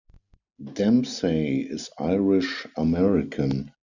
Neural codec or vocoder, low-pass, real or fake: none; 7.2 kHz; real